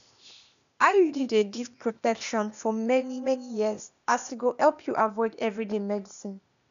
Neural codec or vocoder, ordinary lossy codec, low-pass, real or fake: codec, 16 kHz, 0.8 kbps, ZipCodec; none; 7.2 kHz; fake